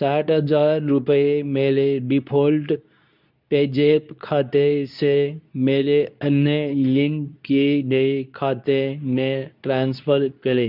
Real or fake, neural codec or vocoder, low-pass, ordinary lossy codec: fake; codec, 24 kHz, 0.9 kbps, WavTokenizer, medium speech release version 2; 5.4 kHz; none